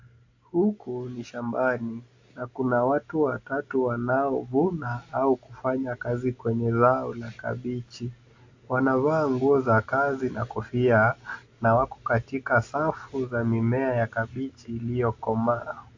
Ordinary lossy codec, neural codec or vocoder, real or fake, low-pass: AAC, 48 kbps; none; real; 7.2 kHz